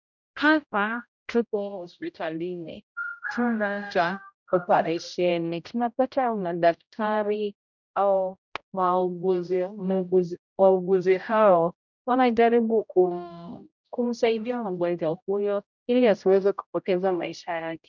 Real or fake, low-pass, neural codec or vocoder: fake; 7.2 kHz; codec, 16 kHz, 0.5 kbps, X-Codec, HuBERT features, trained on general audio